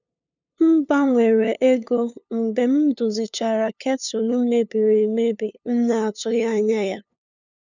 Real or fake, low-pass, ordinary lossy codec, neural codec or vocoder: fake; 7.2 kHz; none; codec, 16 kHz, 2 kbps, FunCodec, trained on LibriTTS, 25 frames a second